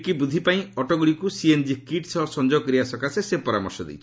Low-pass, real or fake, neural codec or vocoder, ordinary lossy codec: none; real; none; none